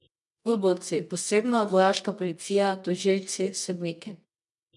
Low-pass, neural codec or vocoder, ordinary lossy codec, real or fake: 10.8 kHz; codec, 24 kHz, 0.9 kbps, WavTokenizer, medium music audio release; none; fake